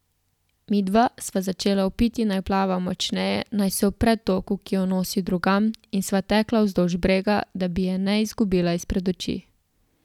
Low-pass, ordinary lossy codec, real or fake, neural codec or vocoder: 19.8 kHz; none; real; none